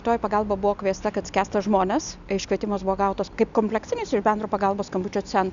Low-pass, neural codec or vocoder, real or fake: 7.2 kHz; none; real